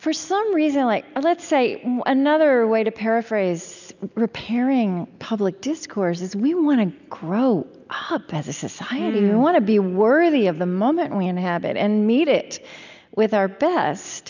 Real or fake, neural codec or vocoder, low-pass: real; none; 7.2 kHz